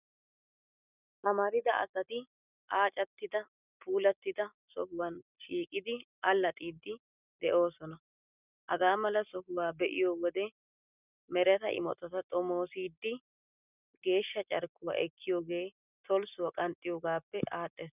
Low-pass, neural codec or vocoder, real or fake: 3.6 kHz; none; real